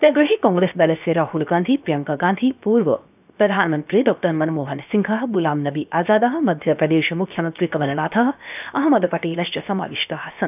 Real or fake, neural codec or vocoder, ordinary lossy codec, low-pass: fake; codec, 16 kHz, 0.7 kbps, FocalCodec; none; 3.6 kHz